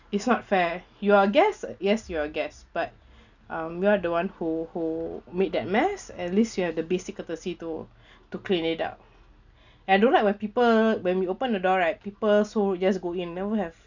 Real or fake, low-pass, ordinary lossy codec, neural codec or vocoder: real; 7.2 kHz; none; none